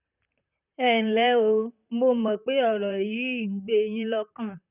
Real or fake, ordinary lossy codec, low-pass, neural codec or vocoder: fake; none; 3.6 kHz; vocoder, 44.1 kHz, 128 mel bands, Pupu-Vocoder